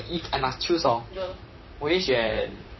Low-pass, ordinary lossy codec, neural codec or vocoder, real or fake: 7.2 kHz; MP3, 24 kbps; vocoder, 44.1 kHz, 128 mel bands, Pupu-Vocoder; fake